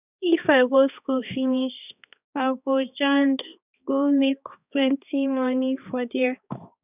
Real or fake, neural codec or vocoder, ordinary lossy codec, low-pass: fake; codec, 16 kHz, 2 kbps, X-Codec, HuBERT features, trained on balanced general audio; none; 3.6 kHz